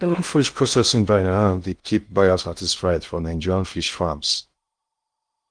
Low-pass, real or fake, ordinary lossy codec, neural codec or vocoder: 9.9 kHz; fake; Opus, 32 kbps; codec, 16 kHz in and 24 kHz out, 0.6 kbps, FocalCodec, streaming, 2048 codes